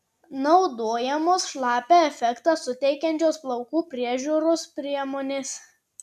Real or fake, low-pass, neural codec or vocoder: real; 14.4 kHz; none